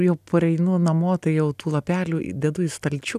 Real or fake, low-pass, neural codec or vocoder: real; 14.4 kHz; none